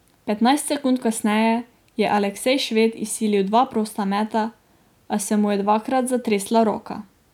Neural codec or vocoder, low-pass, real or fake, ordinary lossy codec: none; 19.8 kHz; real; none